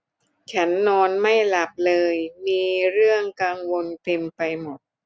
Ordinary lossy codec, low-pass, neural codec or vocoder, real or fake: none; none; none; real